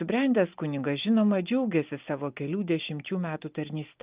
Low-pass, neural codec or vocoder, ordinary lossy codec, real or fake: 3.6 kHz; none; Opus, 24 kbps; real